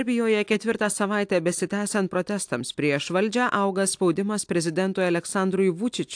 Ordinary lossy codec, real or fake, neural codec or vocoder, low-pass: AAC, 64 kbps; real; none; 9.9 kHz